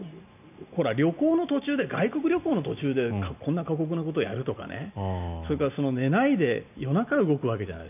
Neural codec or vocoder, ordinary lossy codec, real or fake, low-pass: none; none; real; 3.6 kHz